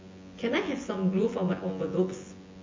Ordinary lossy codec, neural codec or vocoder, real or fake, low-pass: MP3, 32 kbps; vocoder, 24 kHz, 100 mel bands, Vocos; fake; 7.2 kHz